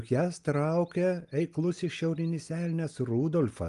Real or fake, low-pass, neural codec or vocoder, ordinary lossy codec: real; 10.8 kHz; none; Opus, 32 kbps